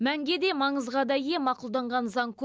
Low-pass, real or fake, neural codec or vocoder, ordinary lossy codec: none; real; none; none